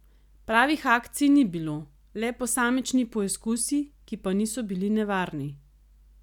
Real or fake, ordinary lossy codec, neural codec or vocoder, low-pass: real; none; none; 19.8 kHz